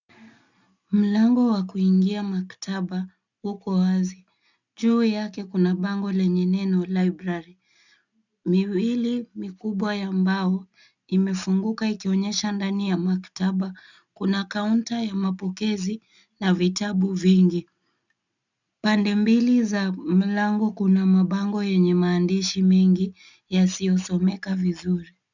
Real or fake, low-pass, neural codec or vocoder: real; 7.2 kHz; none